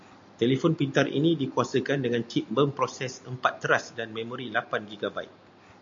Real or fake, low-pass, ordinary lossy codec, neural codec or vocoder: real; 7.2 kHz; MP3, 48 kbps; none